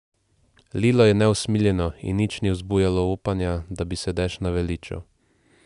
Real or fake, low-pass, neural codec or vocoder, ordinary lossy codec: real; 10.8 kHz; none; none